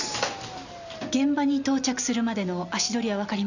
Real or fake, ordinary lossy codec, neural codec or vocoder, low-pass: real; none; none; 7.2 kHz